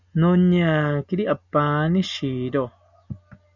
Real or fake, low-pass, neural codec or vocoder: real; 7.2 kHz; none